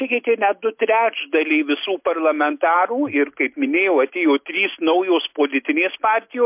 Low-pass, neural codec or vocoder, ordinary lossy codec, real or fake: 3.6 kHz; none; MP3, 32 kbps; real